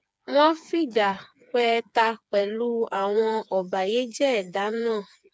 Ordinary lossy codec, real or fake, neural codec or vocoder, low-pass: none; fake; codec, 16 kHz, 4 kbps, FreqCodec, smaller model; none